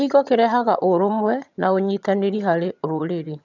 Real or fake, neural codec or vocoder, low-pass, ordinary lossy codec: fake; vocoder, 22.05 kHz, 80 mel bands, HiFi-GAN; 7.2 kHz; none